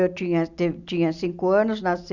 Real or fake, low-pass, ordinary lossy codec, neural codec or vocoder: real; 7.2 kHz; none; none